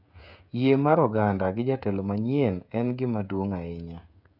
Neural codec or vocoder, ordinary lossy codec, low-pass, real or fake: codec, 16 kHz, 16 kbps, FreqCodec, smaller model; none; 5.4 kHz; fake